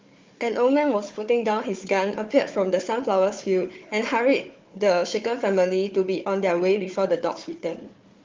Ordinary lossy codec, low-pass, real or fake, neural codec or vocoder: Opus, 32 kbps; 7.2 kHz; fake; codec, 16 kHz, 4 kbps, FunCodec, trained on Chinese and English, 50 frames a second